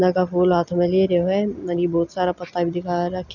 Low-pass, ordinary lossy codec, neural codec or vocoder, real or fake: 7.2 kHz; none; none; real